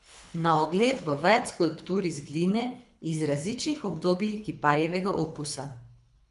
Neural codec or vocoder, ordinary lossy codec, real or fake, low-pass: codec, 24 kHz, 3 kbps, HILCodec; none; fake; 10.8 kHz